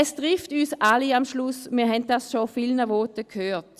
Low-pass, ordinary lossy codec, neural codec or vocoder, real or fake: 14.4 kHz; Opus, 64 kbps; none; real